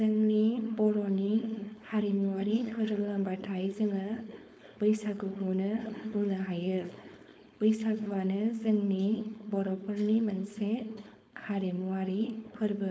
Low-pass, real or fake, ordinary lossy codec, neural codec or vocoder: none; fake; none; codec, 16 kHz, 4.8 kbps, FACodec